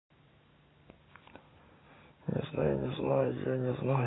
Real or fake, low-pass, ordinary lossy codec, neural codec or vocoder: real; 7.2 kHz; AAC, 16 kbps; none